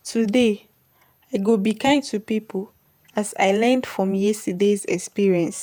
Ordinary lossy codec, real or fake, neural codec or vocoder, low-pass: none; fake; vocoder, 48 kHz, 128 mel bands, Vocos; none